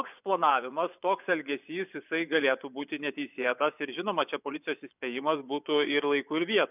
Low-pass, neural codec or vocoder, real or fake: 3.6 kHz; vocoder, 24 kHz, 100 mel bands, Vocos; fake